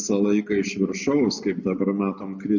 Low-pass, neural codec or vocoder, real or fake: 7.2 kHz; none; real